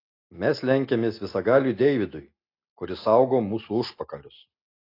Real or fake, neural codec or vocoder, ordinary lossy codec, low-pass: real; none; AAC, 32 kbps; 5.4 kHz